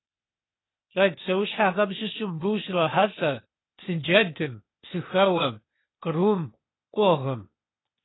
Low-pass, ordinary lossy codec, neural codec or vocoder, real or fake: 7.2 kHz; AAC, 16 kbps; codec, 16 kHz, 0.8 kbps, ZipCodec; fake